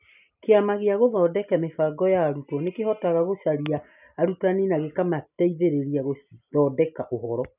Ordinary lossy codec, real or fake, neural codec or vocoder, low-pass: none; real; none; 3.6 kHz